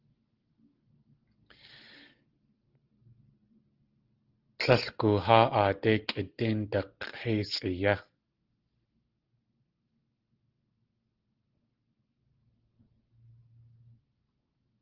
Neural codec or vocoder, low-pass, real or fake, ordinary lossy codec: none; 5.4 kHz; real; Opus, 16 kbps